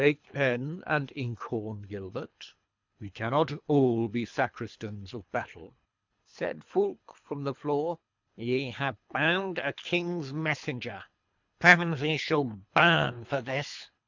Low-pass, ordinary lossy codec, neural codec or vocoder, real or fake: 7.2 kHz; MP3, 64 kbps; codec, 24 kHz, 3 kbps, HILCodec; fake